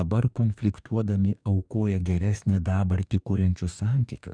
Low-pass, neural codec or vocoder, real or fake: 9.9 kHz; codec, 44.1 kHz, 2.6 kbps, DAC; fake